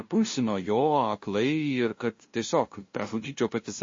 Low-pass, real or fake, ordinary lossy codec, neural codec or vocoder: 7.2 kHz; fake; MP3, 32 kbps; codec, 16 kHz, 0.5 kbps, FunCodec, trained on LibriTTS, 25 frames a second